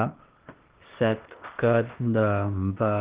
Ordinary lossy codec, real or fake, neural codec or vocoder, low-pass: Opus, 16 kbps; fake; codec, 16 kHz, 0.8 kbps, ZipCodec; 3.6 kHz